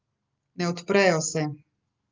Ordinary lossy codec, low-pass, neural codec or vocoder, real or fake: Opus, 24 kbps; 7.2 kHz; none; real